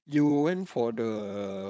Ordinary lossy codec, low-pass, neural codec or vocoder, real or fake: none; none; codec, 16 kHz, 4.8 kbps, FACodec; fake